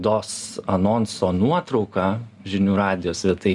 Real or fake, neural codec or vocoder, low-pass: real; none; 10.8 kHz